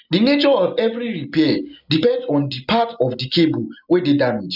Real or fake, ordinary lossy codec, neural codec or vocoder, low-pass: real; none; none; 5.4 kHz